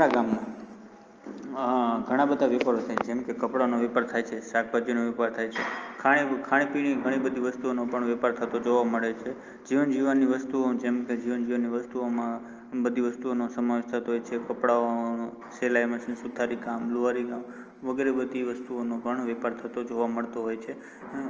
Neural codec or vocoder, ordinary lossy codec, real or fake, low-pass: none; Opus, 24 kbps; real; 7.2 kHz